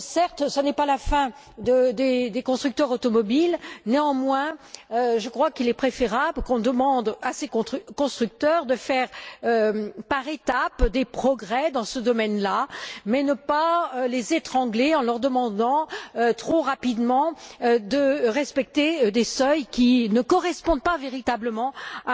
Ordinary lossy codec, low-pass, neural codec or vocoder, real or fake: none; none; none; real